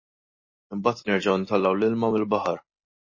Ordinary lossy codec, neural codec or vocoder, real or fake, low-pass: MP3, 32 kbps; none; real; 7.2 kHz